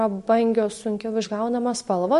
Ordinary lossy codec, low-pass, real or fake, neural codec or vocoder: MP3, 48 kbps; 14.4 kHz; real; none